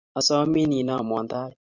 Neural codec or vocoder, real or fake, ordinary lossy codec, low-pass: vocoder, 44.1 kHz, 128 mel bands every 512 samples, BigVGAN v2; fake; Opus, 64 kbps; 7.2 kHz